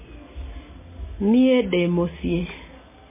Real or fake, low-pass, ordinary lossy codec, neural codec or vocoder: real; 3.6 kHz; MP3, 16 kbps; none